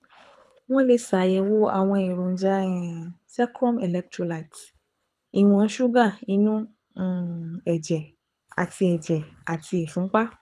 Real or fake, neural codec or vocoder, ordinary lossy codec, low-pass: fake; codec, 24 kHz, 6 kbps, HILCodec; none; none